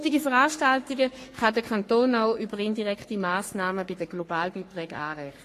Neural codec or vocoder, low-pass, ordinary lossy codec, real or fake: codec, 44.1 kHz, 3.4 kbps, Pupu-Codec; 14.4 kHz; AAC, 48 kbps; fake